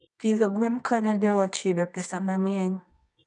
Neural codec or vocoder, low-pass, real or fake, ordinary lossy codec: codec, 24 kHz, 0.9 kbps, WavTokenizer, medium music audio release; 10.8 kHz; fake; none